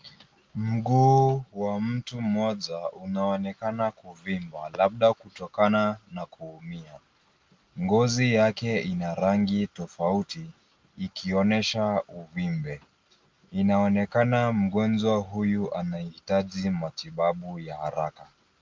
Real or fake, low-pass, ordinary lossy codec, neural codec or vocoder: real; 7.2 kHz; Opus, 32 kbps; none